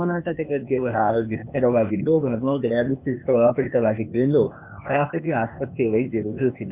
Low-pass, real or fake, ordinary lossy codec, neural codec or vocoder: 3.6 kHz; fake; none; codec, 16 kHz, 0.8 kbps, ZipCodec